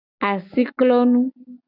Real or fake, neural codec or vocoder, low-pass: real; none; 5.4 kHz